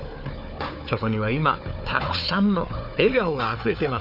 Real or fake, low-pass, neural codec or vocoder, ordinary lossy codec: fake; 5.4 kHz; codec, 16 kHz, 4 kbps, FunCodec, trained on Chinese and English, 50 frames a second; none